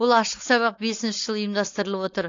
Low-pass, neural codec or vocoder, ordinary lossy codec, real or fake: 7.2 kHz; codec, 16 kHz, 4.8 kbps, FACodec; AAC, 48 kbps; fake